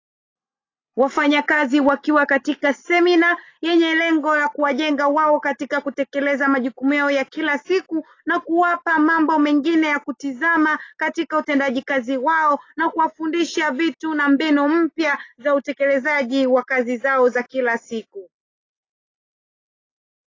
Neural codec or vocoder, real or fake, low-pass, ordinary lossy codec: none; real; 7.2 kHz; AAC, 32 kbps